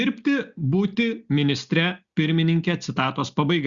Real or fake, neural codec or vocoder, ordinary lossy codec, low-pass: real; none; Opus, 64 kbps; 7.2 kHz